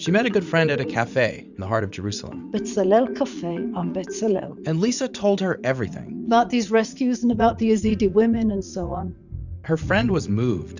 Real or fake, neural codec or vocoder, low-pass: fake; vocoder, 44.1 kHz, 80 mel bands, Vocos; 7.2 kHz